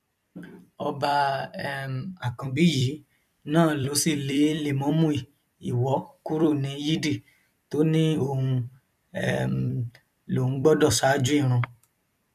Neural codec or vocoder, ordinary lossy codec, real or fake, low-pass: vocoder, 44.1 kHz, 128 mel bands every 256 samples, BigVGAN v2; none; fake; 14.4 kHz